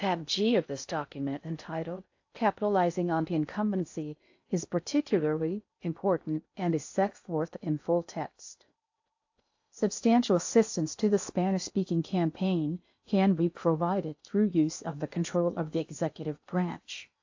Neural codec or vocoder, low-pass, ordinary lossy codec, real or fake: codec, 16 kHz in and 24 kHz out, 0.6 kbps, FocalCodec, streaming, 4096 codes; 7.2 kHz; AAC, 48 kbps; fake